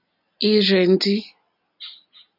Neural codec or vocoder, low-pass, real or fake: none; 5.4 kHz; real